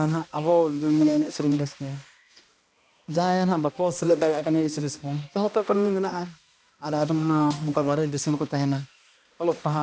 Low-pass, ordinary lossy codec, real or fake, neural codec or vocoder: none; none; fake; codec, 16 kHz, 1 kbps, X-Codec, HuBERT features, trained on balanced general audio